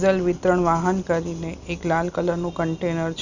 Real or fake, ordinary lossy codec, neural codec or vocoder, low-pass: real; none; none; 7.2 kHz